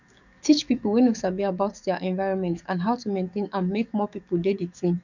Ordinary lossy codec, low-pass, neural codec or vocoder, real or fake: none; 7.2 kHz; codec, 44.1 kHz, 7.8 kbps, DAC; fake